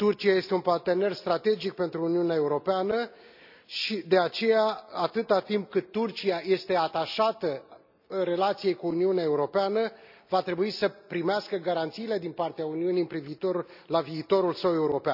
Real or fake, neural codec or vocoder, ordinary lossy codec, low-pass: real; none; none; 5.4 kHz